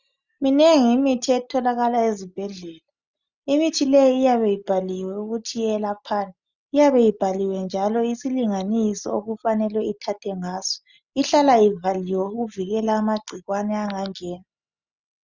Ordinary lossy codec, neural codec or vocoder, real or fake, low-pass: Opus, 64 kbps; none; real; 7.2 kHz